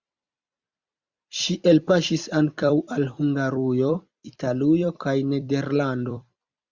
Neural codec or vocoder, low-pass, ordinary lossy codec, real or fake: vocoder, 44.1 kHz, 128 mel bands every 256 samples, BigVGAN v2; 7.2 kHz; Opus, 64 kbps; fake